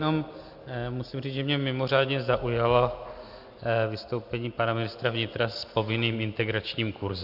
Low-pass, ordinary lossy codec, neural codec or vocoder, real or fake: 5.4 kHz; Opus, 64 kbps; none; real